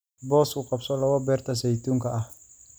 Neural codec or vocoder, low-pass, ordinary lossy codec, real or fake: none; none; none; real